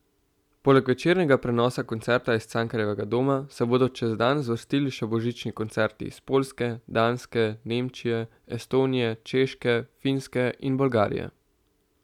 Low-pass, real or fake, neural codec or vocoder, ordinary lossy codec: 19.8 kHz; real; none; none